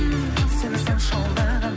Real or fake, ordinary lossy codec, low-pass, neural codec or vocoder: real; none; none; none